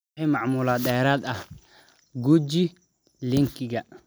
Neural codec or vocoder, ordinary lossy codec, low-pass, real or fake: none; none; none; real